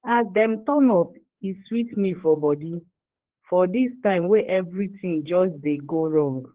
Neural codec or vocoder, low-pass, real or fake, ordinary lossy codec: codec, 16 kHz, 4 kbps, X-Codec, HuBERT features, trained on general audio; 3.6 kHz; fake; Opus, 16 kbps